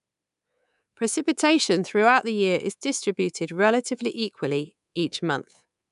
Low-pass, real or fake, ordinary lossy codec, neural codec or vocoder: 10.8 kHz; fake; none; codec, 24 kHz, 3.1 kbps, DualCodec